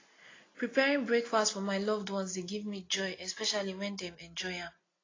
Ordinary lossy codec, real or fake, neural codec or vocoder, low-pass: AAC, 32 kbps; real; none; 7.2 kHz